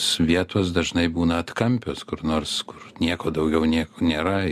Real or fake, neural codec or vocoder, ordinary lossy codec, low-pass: real; none; MP3, 96 kbps; 14.4 kHz